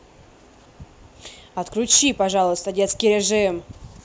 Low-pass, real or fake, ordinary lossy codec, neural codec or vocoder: none; real; none; none